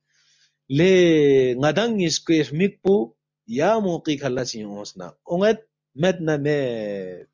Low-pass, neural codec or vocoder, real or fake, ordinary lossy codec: 7.2 kHz; none; real; MP3, 64 kbps